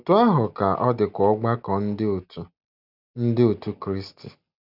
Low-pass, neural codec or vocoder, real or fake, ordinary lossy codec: 5.4 kHz; none; real; none